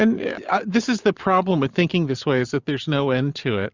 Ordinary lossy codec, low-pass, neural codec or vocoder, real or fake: Opus, 64 kbps; 7.2 kHz; vocoder, 44.1 kHz, 128 mel bands every 512 samples, BigVGAN v2; fake